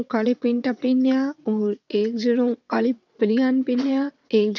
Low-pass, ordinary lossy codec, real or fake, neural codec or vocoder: 7.2 kHz; none; fake; vocoder, 22.05 kHz, 80 mel bands, WaveNeXt